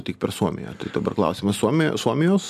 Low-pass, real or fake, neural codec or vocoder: 14.4 kHz; real; none